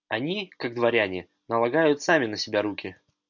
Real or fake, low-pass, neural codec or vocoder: real; 7.2 kHz; none